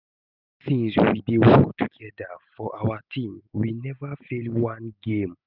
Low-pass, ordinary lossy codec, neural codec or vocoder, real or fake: 5.4 kHz; none; none; real